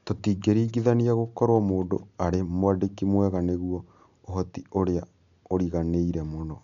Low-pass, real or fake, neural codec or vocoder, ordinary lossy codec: 7.2 kHz; real; none; none